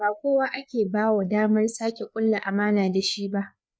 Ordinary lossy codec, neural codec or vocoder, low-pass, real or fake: none; codec, 16 kHz, 4 kbps, FreqCodec, larger model; none; fake